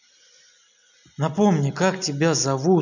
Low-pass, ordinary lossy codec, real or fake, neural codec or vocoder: 7.2 kHz; none; real; none